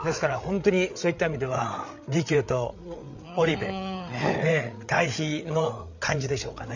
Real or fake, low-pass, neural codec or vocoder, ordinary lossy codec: fake; 7.2 kHz; codec, 16 kHz, 8 kbps, FreqCodec, larger model; none